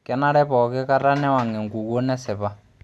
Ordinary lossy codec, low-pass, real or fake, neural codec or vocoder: none; none; real; none